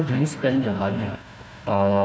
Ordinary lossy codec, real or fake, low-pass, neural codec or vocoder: none; fake; none; codec, 16 kHz, 1 kbps, FunCodec, trained on Chinese and English, 50 frames a second